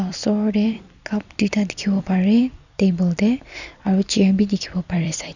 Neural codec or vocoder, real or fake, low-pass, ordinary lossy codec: vocoder, 44.1 kHz, 128 mel bands every 256 samples, BigVGAN v2; fake; 7.2 kHz; none